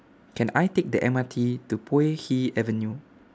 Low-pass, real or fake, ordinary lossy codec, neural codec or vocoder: none; real; none; none